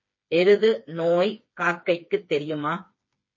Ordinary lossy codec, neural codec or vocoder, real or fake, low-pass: MP3, 32 kbps; codec, 16 kHz, 4 kbps, FreqCodec, smaller model; fake; 7.2 kHz